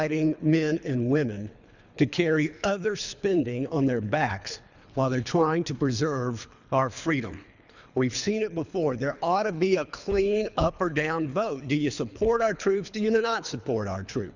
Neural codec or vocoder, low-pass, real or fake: codec, 24 kHz, 3 kbps, HILCodec; 7.2 kHz; fake